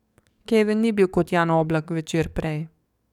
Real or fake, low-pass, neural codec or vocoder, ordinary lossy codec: fake; 19.8 kHz; codec, 44.1 kHz, 7.8 kbps, DAC; none